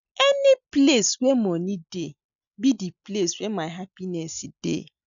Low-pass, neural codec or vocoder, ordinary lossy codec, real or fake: 7.2 kHz; none; none; real